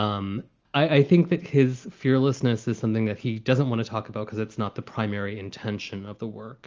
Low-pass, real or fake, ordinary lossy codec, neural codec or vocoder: 7.2 kHz; real; Opus, 24 kbps; none